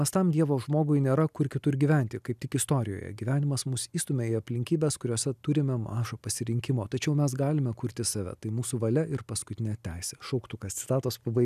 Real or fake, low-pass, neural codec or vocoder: real; 14.4 kHz; none